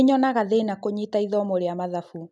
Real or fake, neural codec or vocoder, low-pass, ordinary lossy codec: real; none; none; none